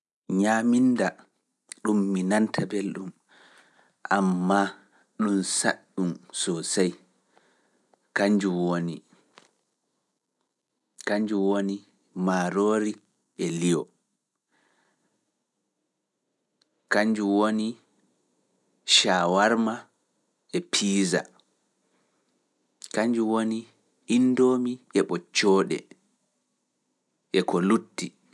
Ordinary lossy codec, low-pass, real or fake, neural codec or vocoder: none; none; real; none